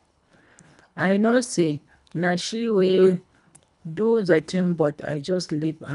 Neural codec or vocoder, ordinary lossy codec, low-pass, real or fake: codec, 24 kHz, 1.5 kbps, HILCodec; none; 10.8 kHz; fake